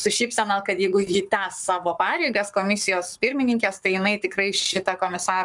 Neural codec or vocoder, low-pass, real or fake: codec, 44.1 kHz, 7.8 kbps, Pupu-Codec; 10.8 kHz; fake